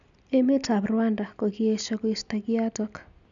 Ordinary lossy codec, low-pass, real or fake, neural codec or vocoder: none; 7.2 kHz; real; none